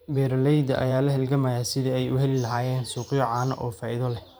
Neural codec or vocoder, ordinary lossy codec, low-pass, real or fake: vocoder, 44.1 kHz, 128 mel bands every 512 samples, BigVGAN v2; none; none; fake